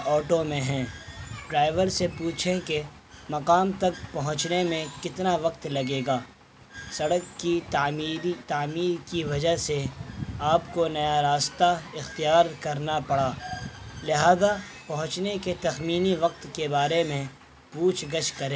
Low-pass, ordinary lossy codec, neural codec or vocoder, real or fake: none; none; none; real